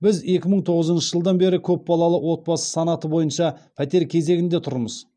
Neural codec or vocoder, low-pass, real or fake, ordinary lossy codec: none; 9.9 kHz; real; none